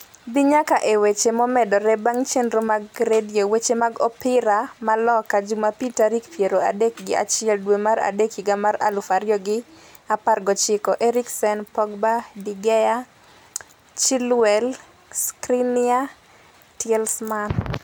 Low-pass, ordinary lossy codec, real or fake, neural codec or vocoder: none; none; real; none